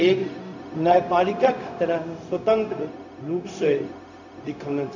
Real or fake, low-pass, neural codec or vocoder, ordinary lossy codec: fake; 7.2 kHz; codec, 16 kHz, 0.4 kbps, LongCat-Audio-Codec; none